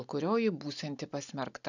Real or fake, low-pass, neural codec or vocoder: real; 7.2 kHz; none